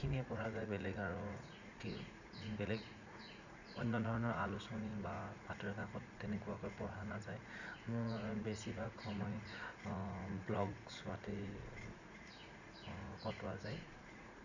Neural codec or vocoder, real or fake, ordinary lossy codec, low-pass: vocoder, 44.1 kHz, 80 mel bands, Vocos; fake; none; 7.2 kHz